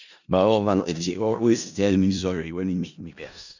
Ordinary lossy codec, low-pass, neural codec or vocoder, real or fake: none; 7.2 kHz; codec, 16 kHz in and 24 kHz out, 0.4 kbps, LongCat-Audio-Codec, four codebook decoder; fake